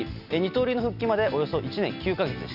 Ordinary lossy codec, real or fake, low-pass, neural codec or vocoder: none; real; 5.4 kHz; none